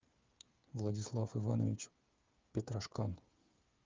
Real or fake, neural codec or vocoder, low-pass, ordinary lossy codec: fake; codec, 16 kHz, 8 kbps, FreqCodec, smaller model; 7.2 kHz; Opus, 24 kbps